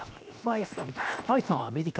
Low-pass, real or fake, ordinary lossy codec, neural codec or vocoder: none; fake; none; codec, 16 kHz, 0.7 kbps, FocalCodec